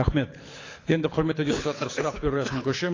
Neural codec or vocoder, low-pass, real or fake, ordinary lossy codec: codec, 24 kHz, 6 kbps, HILCodec; 7.2 kHz; fake; AAC, 32 kbps